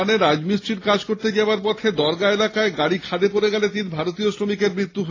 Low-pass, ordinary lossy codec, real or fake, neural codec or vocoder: 7.2 kHz; AAC, 32 kbps; real; none